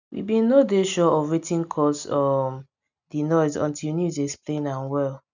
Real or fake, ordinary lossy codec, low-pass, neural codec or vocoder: real; none; 7.2 kHz; none